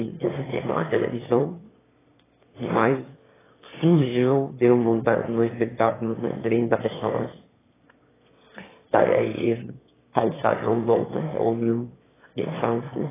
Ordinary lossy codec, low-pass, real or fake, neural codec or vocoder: AAC, 16 kbps; 3.6 kHz; fake; autoencoder, 22.05 kHz, a latent of 192 numbers a frame, VITS, trained on one speaker